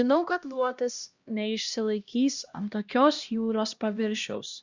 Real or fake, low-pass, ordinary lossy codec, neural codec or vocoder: fake; 7.2 kHz; Opus, 64 kbps; codec, 16 kHz, 1 kbps, X-Codec, HuBERT features, trained on LibriSpeech